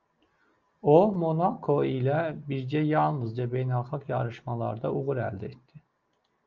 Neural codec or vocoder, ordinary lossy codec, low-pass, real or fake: none; Opus, 32 kbps; 7.2 kHz; real